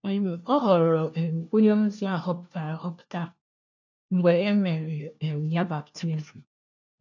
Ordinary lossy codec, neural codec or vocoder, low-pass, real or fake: none; codec, 16 kHz, 1 kbps, FunCodec, trained on LibriTTS, 50 frames a second; 7.2 kHz; fake